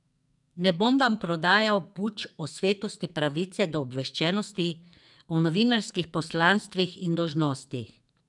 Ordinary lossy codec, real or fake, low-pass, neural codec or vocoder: none; fake; 10.8 kHz; codec, 44.1 kHz, 2.6 kbps, SNAC